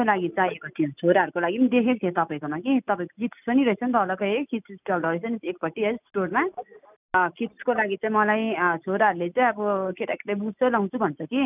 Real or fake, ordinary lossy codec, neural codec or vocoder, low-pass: real; none; none; 3.6 kHz